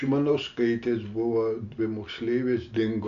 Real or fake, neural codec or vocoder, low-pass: real; none; 7.2 kHz